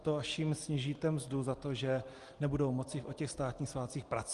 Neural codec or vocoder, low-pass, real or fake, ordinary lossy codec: none; 10.8 kHz; real; Opus, 24 kbps